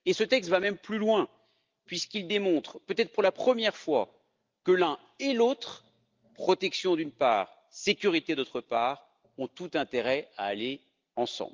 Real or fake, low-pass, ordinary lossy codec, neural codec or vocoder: real; 7.2 kHz; Opus, 24 kbps; none